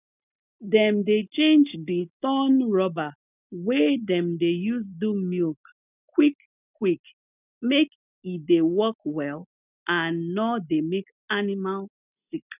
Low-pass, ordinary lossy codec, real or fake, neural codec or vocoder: 3.6 kHz; none; real; none